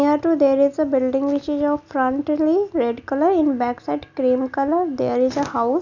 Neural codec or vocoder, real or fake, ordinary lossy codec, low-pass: none; real; none; 7.2 kHz